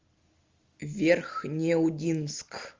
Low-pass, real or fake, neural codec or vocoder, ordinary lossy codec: 7.2 kHz; real; none; Opus, 32 kbps